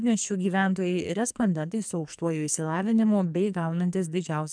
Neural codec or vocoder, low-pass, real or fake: codec, 44.1 kHz, 2.6 kbps, SNAC; 9.9 kHz; fake